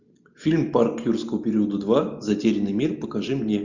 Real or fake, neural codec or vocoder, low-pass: real; none; 7.2 kHz